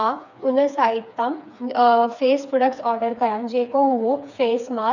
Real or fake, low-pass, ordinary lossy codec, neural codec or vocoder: fake; 7.2 kHz; none; codec, 16 kHz, 4 kbps, FreqCodec, smaller model